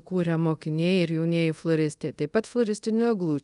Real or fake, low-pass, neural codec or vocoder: fake; 10.8 kHz; codec, 24 kHz, 0.5 kbps, DualCodec